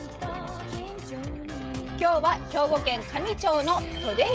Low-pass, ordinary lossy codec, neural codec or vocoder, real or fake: none; none; codec, 16 kHz, 16 kbps, FreqCodec, smaller model; fake